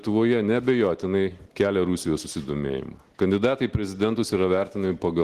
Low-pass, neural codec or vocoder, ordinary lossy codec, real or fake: 14.4 kHz; none; Opus, 16 kbps; real